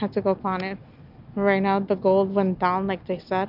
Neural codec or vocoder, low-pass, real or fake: none; 5.4 kHz; real